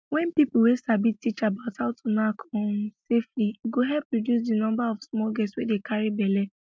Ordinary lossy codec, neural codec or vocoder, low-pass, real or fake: none; none; none; real